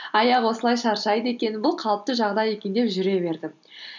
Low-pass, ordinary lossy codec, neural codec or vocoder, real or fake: 7.2 kHz; none; none; real